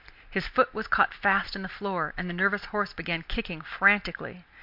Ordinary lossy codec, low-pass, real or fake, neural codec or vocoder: MP3, 48 kbps; 5.4 kHz; real; none